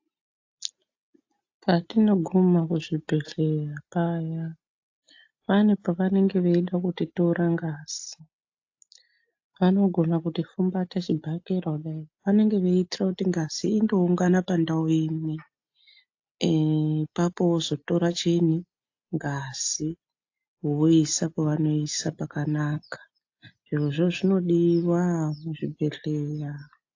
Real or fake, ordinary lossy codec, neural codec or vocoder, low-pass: real; AAC, 48 kbps; none; 7.2 kHz